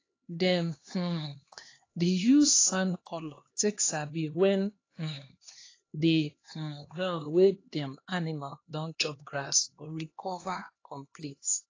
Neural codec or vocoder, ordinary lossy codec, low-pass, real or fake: codec, 16 kHz, 2 kbps, X-Codec, HuBERT features, trained on LibriSpeech; AAC, 32 kbps; 7.2 kHz; fake